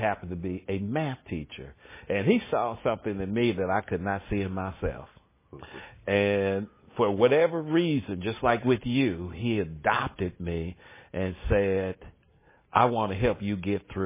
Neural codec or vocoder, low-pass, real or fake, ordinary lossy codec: none; 3.6 kHz; real; MP3, 16 kbps